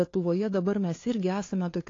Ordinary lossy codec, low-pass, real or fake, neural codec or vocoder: AAC, 32 kbps; 7.2 kHz; fake; codec, 16 kHz, 2 kbps, FunCodec, trained on LibriTTS, 25 frames a second